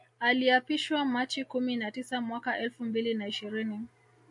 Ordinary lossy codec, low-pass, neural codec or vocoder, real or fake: AAC, 64 kbps; 10.8 kHz; none; real